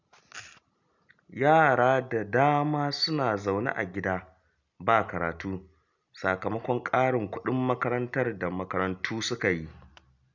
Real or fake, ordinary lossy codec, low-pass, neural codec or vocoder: real; none; 7.2 kHz; none